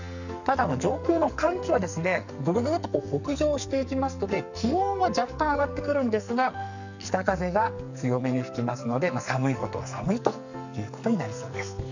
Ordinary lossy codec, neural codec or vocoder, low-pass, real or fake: none; codec, 44.1 kHz, 2.6 kbps, SNAC; 7.2 kHz; fake